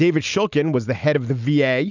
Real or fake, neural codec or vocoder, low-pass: real; none; 7.2 kHz